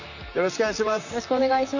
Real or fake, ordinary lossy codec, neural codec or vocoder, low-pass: fake; none; vocoder, 44.1 kHz, 128 mel bands, Pupu-Vocoder; 7.2 kHz